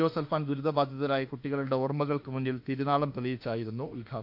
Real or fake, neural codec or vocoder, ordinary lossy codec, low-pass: fake; autoencoder, 48 kHz, 32 numbers a frame, DAC-VAE, trained on Japanese speech; AAC, 48 kbps; 5.4 kHz